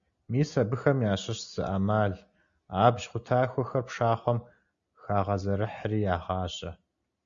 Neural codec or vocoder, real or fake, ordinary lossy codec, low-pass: none; real; Opus, 64 kbps; 7.2 kHz